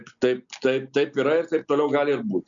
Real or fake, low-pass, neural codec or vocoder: real; 7.2 kHz; none